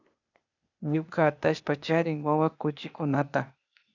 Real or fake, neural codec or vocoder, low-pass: fake; codec, 16 kHz, 0.8 kbps, ZipCodec; 7.2 kHz